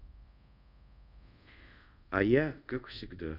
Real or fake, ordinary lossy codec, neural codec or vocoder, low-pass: fake; none; codec, 24 kHz, 0.5 kbps, DualCodec; 5.4 kHz